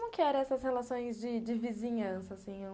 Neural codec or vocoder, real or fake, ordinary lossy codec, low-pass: none; real; none; none